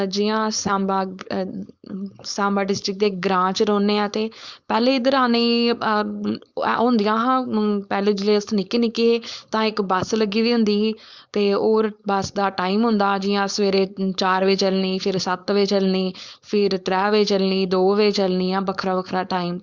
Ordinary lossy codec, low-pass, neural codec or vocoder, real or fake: Opus, 64 kbps; 7.2 kHz; codec, 16 kHz, 4.8 kbps, FACodec; fake